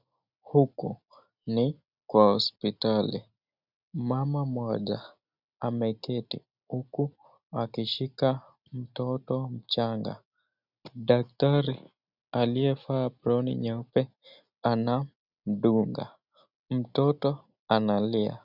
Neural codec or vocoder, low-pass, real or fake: none; 5.4 kHz; real